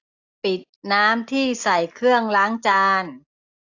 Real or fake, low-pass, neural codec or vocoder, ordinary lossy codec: real; 7.2 kHz; none; AAC, 48 kbps